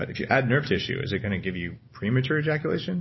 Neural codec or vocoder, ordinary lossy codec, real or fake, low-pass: none; MP3, 24 kbps; real; 7.2 kHz